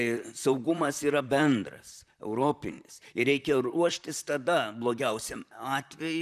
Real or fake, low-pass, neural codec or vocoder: fake; 14.4 kHz; vocoder, 44.1 kHz, 128 mel bands, Pupu-Vocoder